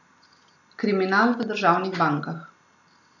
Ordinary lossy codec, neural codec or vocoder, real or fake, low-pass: none; none; real; 7.2 kHz